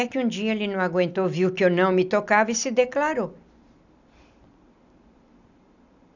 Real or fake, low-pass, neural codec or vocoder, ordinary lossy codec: real; 7.2 kHz; none; none